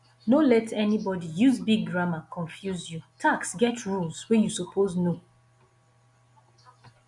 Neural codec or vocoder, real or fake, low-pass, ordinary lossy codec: none; real; 10.8 kHz; MP3, 64 kbps